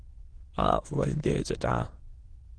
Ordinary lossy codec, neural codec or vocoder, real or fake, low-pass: Opus, 16 kbps; autoencoder, 22.05 kHz, a latent of 192 numbers a frame, VITS, trained on many speakers; fake; 9.9 kHz